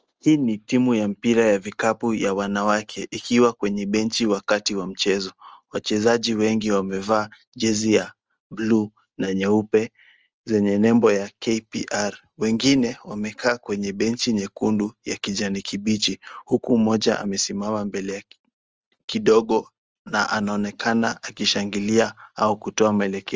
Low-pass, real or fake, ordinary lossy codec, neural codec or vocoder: 7.2 kHz; real; Opus, 24 kbps; none